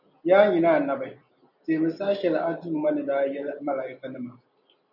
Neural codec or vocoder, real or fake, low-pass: none; real; 5.4 kHz